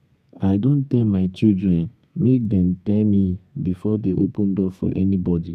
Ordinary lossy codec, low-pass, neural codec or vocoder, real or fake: none; 14.4 kHz; codec, 32 kHz, 1.9 kbps, SNAC; fake